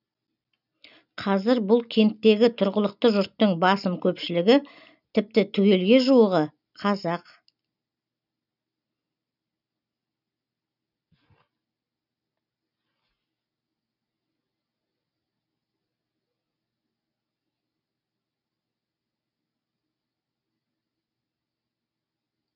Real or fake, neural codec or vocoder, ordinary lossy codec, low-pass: real; none; none; 5.4 kHz